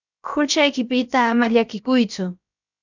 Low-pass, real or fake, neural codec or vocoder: 7.2 kHz; fake; codec, 16 kHz, about 1 kbps, DyCAST, with the encoder's durations